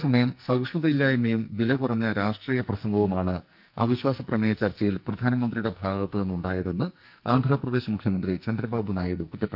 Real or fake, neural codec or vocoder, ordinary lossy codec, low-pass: fake; codec, 44.1 kHz, 2.6 kbps, SNAC; none; 5.4 kHz